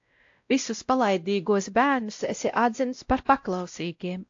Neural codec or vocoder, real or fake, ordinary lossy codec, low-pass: codec, 16 kHz, 0.5 kbps, X-Codec, WavLM features, trained on Multilingual LibriSpeech; fake; AAC, 48 kbps; 7.2 kHz